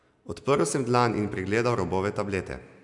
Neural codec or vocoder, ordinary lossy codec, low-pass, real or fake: none; MP3, 96 kbps; 10.8 kHz; real